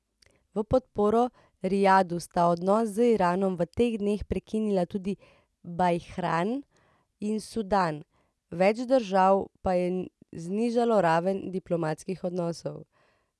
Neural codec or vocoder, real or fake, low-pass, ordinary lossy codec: none; real; none; none